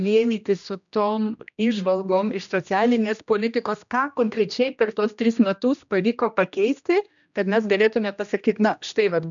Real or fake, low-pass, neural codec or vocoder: fake; 7.2 kHz; codec, 16 kHz, 1 kbps, X-Codec, HuBERT features, trained on general audio